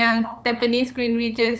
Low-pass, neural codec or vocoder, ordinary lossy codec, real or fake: none; codec, 16 kHz, 8 kbps, FunCodec, trained on LibriTTS, 25 frames a second; none; fake